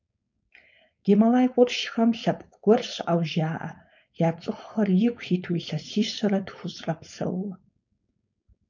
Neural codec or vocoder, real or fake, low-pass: codec, 16 kHz, 4.8 kbps, FACodec; fake; 7.2 kHz